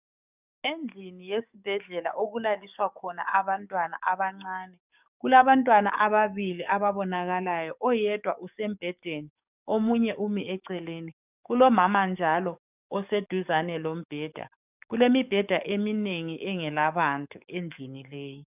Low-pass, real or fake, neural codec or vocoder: 3.6 kHz; fake; codec, 44.1 kHz, 7.8 kbps, DAC